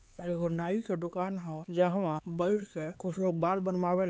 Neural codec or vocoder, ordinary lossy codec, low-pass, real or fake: codec, 16 kHz, 4 kbps, X-Codec, HuBERT features, trained on LibriSpeech; none; none; fake